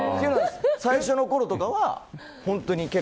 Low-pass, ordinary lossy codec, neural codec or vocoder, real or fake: none; none; none; real